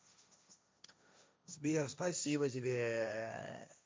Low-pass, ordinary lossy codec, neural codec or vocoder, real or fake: none; none; codec, 16 kHz, 1.1 kbps, Voila-Tokenizer; fake